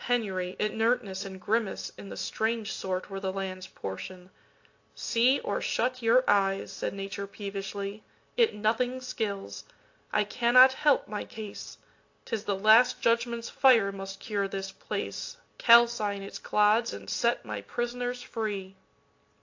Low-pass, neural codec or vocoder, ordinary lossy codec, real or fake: 7.2 kHz; none; AAC, 48 kbps; real